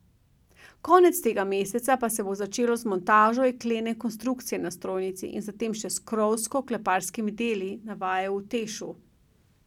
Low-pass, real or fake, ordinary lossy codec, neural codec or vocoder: 19.8 kHz; real; none; none